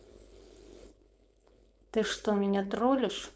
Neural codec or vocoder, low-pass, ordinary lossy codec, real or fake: codec, 16 kHz, 4.8 kbps, FACodec; none; none; fake